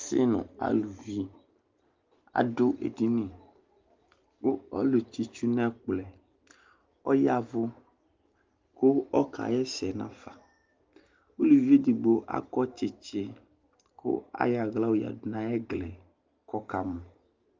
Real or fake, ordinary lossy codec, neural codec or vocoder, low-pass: real; Opus, 32 kbps; none; 7.2 kHz